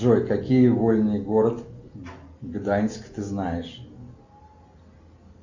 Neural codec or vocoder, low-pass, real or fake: none; 7.2 kHz; real